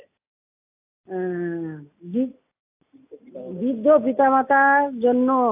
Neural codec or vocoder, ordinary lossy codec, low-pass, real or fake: none; AAC, 32 kbps; 3.6 kHz; real